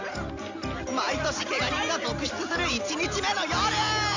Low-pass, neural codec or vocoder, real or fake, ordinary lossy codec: 7.2 kHz; none; real; AAC, 48 kbps